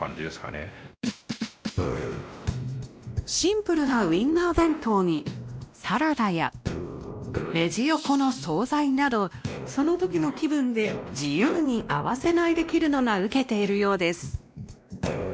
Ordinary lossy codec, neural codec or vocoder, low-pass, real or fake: none; codec, 16 kHz, 1 kbps, X-Codec, WavLM features, trained on Multilingual LibriSpeech; none; fake